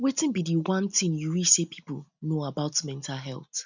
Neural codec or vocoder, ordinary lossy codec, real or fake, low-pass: none; none; real; 7.2 kHz